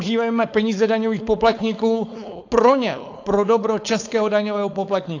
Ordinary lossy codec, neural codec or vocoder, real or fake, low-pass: AAC, 48 kbps; codec, 16 kHz, 4.8 kbps, FACodec; fake; 7.2 kHz